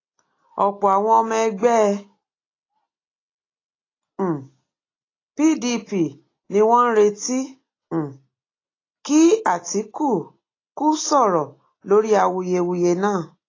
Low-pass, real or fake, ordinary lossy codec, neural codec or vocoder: 7.2 kHz; real; AAC, 32 kbps; none